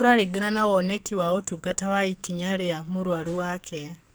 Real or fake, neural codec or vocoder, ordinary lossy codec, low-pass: fake; codec, 44.1 kHz, 2.6 kbps, SNAC; none; none